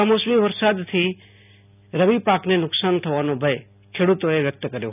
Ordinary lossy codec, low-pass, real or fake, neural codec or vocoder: none; 3.6 kHz; real; none